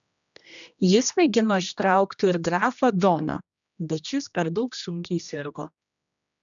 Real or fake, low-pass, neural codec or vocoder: fake; 7.2 kHz; codec, 16 kHz, 1 kbps, X-Codec, HuBERT features, trained on general audio